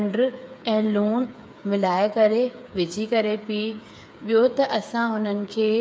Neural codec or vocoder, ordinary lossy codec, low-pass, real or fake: codec, 16 kHz, 8 kbps, FreqCodec, smaller model; none; none; fake